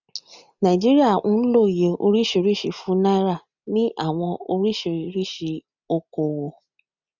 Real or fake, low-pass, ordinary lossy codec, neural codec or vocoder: real; 7.2 kHz; none; none